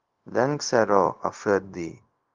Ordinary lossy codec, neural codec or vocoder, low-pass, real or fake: Opus, 32 kbps; codec, 16 kHz, 0.4 kbps, LongCat-Audio-Codec; 7.2 kHz; fake